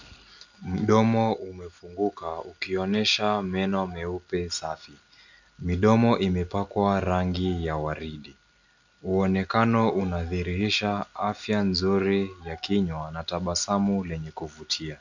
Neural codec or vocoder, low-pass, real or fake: none; 7.2 kHz; real